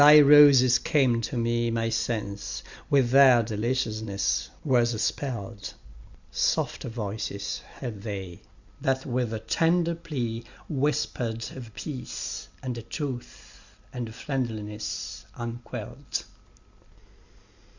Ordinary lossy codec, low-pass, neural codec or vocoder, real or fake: Opus, 64 kbps; 7.2 kHz; none; real